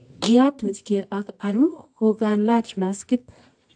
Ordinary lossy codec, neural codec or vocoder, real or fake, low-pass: AAC, 64 kbps; codec, 24 kHz, 0.9 kbps, WavTokenizer, medium music audio release; fake; 9.9 kHz